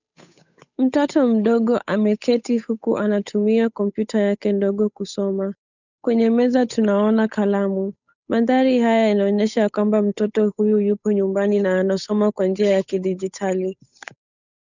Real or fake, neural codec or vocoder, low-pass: fake; codec, 16 kHz, 8 kbps, FunCodec, trained on Chinese and English, 25 frames a second; 7.2 kHz